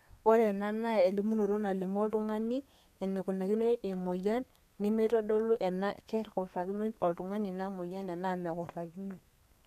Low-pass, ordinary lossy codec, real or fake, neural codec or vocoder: 14.4 kHz; none; fake; codec, 32 kHz, 1.9 kbps, SNAC